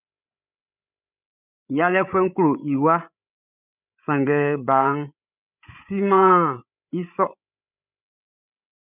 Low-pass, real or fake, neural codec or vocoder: 3.6 kHz; fake; codec, 16 kHz, 8 kbps, FreqCodec, larger model